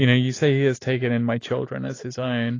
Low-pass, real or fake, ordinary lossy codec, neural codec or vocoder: 7.2 kHz; real; AAC, 32 kbps; none